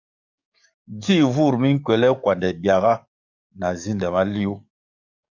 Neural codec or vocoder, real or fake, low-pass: codec, 44.1 kHz, 7.8 kbps, DAC; fake; 7.2 kHz